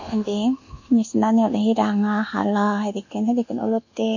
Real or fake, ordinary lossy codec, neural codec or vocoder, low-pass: fake; none; codec, 24 kHz, 1.2 kbps, DualCodec; 7.2 kHz